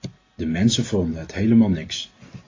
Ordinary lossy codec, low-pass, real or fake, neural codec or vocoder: AAC, 32 kbps; 7.2 kHz; real; none